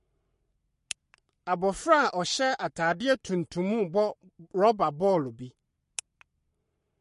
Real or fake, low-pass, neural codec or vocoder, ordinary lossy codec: fake; 14.4 kHz; codec, 44.1 kHz, 7.8 kbps, Pupu-Codec; MP3, 48 kbps